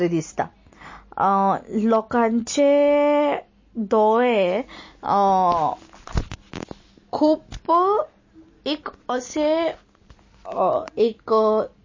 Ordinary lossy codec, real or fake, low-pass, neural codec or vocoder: MP3, 32 kbps; real; 7.2 kHz; none